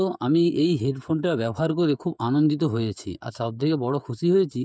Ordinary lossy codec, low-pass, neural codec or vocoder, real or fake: none; none; codec, 16 kHz, 16 kbps, FreqCodec, smaller model; fake